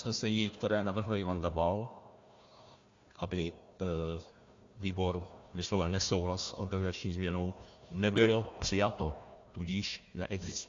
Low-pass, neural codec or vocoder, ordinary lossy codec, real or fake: 7.2 kHz; codec, 16 kHz, 1 kbps, FunCodec, trained on Chinese and English, 50 frames a second; AAC, 48 kbps; fake